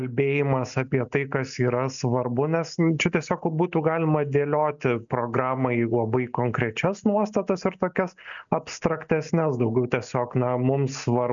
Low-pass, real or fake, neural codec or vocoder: 7.2 kHz; real; none